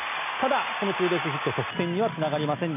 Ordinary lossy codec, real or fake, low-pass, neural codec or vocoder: none; real; 3.6 kHz; none